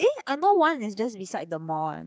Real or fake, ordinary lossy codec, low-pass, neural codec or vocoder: fake; none; none; codec, 16 kHz, 4 kbps, X-Codec, HuBERT features, trained on general audio